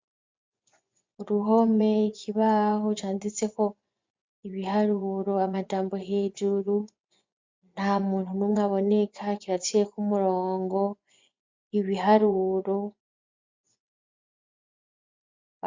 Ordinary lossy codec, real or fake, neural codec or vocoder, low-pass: MP3, 64 kbps; fake; vocoder, 24 kHz, 100 mel bands, Vocos; 7.2 kHz